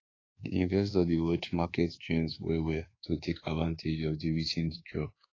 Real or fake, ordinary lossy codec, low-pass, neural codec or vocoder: fake; AAC, 32 kbps; 7.2 kHz; codec, 24 kHz, 1.2 kbps, DualCodec